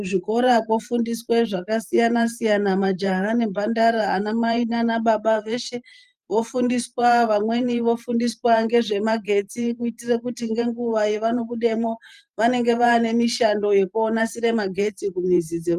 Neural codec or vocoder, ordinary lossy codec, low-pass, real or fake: vocoder, 44.1 kHz, 128 mel bands every 512 samples, BigVGAN v2; Opus, 32 kbps; 14.4 kHz; fake